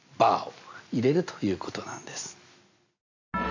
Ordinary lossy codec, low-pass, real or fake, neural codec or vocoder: AAC, 48 kbps; 7.2 kHz; real; none